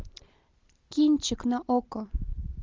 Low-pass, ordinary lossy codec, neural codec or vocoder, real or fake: 7.2 kHz; Opus, 32 kbps; none; real